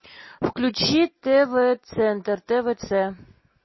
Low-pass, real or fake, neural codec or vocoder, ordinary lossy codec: 7.2 kHz; real; none; MP3, 24 kbps